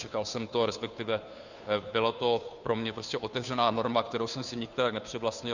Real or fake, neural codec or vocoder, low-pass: fake; codec, 16 kHz, 2 kbps, FunCodec, trained on Chinese and English, 25 frames a second; 7.2 kHz